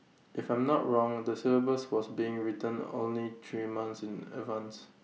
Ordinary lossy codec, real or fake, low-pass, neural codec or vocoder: none; real; none; none